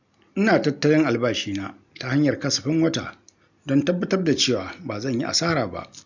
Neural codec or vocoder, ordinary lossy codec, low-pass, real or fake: none; none; 7.2 kHz; real